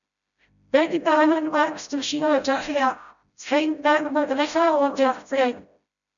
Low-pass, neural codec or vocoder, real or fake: 7.2 kHz; codec, 16 kHz, 0.5 kbps, FreqCodec, smaller model; fake